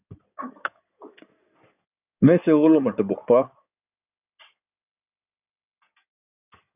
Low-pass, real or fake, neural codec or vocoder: 3.6 kHz; fake; codec, 16 kHz in and 24 kHz out, 2.2 kbps, FireRedTTS-2 codec